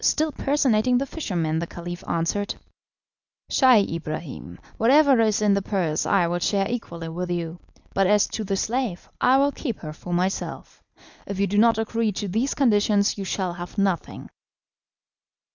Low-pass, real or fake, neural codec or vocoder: 7.2 kHz; real; none